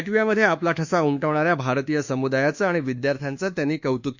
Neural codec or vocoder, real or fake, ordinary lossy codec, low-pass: codec, 24 kHz, 1.2 kbps, DualCodec; fake; Opus, 64 kbps; 7.2 kHz